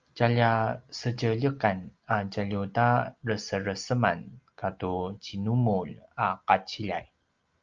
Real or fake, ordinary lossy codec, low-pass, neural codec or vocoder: real; Opus, 24 kbps; 7.2 kHz; none